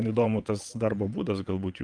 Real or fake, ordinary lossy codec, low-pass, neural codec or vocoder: fake; Opus, 32 kbps; 9.9 kHz; vocoder, 22.05 kHz, 80 mel bands, WaveNeXt